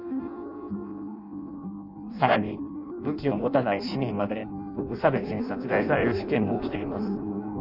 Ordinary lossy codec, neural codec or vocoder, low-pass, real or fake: none; codec, 16 kHz in and 24 kHz out, 0.6 kbps, FireRedTTS-2 codec; 5.4 kHz; fake